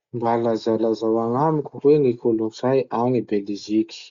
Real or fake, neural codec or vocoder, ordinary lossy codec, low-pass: real; none; Opus, 64 kbps; 7.2 kHz